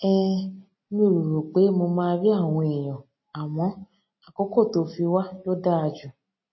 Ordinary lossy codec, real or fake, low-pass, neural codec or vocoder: MP3, 24 kbps; real; 7.2 kHz; none